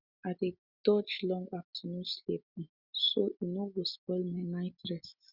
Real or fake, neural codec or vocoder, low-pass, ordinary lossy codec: real; none; 5.4 kHz; Opus, 64 kbps